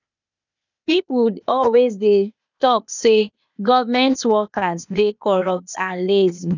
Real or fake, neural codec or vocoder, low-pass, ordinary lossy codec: fake; codec, 16 kHz, 0.8 kbps, ZipCodec; 7.2 kHz; none